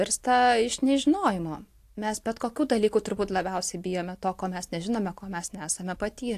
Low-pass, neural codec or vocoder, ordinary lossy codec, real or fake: 14.4 kHz; none; AAC, 64 kbps; real